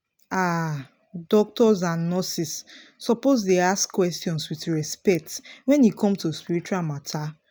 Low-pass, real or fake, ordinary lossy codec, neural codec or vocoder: none; real; none; none